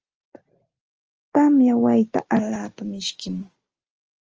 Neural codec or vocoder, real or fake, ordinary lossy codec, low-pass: none; real; Opus, 24 kbps; 7.2 kHz